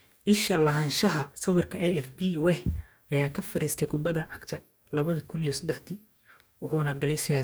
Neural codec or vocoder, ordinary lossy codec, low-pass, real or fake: codec, 44.1 kHz, 2.6 kbps, DAC; none; none; fake